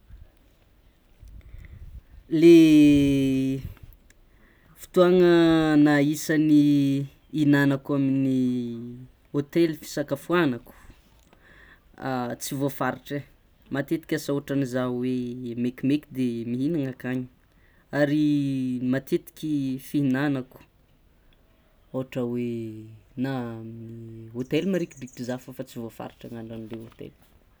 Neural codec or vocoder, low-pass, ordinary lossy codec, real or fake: none; none; none; real